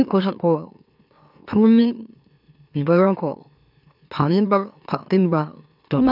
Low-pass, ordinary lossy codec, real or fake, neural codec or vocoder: 5.4 kHz; none; fake; autoencoder, 44.1 kHz, a latent of 192 numbers a frame, MeloTTS